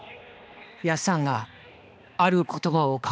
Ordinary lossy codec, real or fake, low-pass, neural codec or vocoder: none; fake; none; codec, 16 kHz, 2 kbps, X-Codec, HuBERT features, trained on balanced general audio